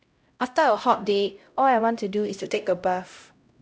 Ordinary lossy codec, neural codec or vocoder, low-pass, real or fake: none; codec, 16 kHz, 0.5 kbps, X-Codec, HuBERT features, trained on LibriSpeech; none; fake